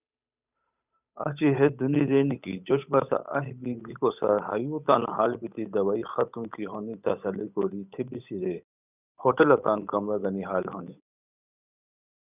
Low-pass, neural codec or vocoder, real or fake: 3.6 kHz; codec, 16 kHz, 8 kbps, FunCodec, trained on Chinese and English, 25 frames a second; fake